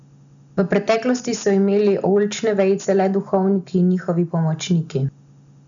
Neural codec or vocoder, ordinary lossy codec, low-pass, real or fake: none; none; 7.2 kHz; real